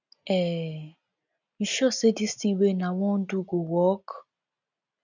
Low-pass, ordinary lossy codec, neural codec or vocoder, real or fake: 7.2 kHz; none; none; real